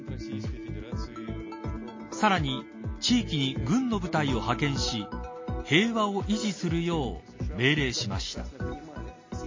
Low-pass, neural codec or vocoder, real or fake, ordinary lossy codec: 7.2 kHz; none; real; MP3, 32 kbps